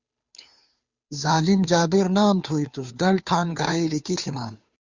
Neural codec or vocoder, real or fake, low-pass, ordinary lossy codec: codec, 16 kHz, 2 kbps, FunCodec, trained on Chinese and English, 25 frames a second; fake; 7.2 kHz; Opus, 64 kbps